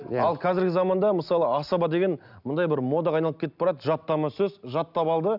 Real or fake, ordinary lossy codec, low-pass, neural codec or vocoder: real; none; 5.4 kHz; none